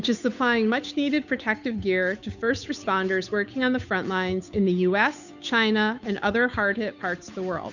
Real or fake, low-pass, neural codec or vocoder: fake; 7.2 kHz; codec, 16 kHz, 8 kbps, FunCodec, trained on Chinese and English, 25 frames a second